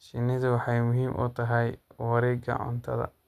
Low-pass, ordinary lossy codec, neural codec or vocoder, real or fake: 14.4 kHz; none; none; real